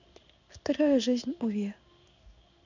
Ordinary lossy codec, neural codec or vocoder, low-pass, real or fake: none; codec, 16 kHz in and 24 kHz out, 1 kbps, XY-Tokenizer; 7.2 kHz; fake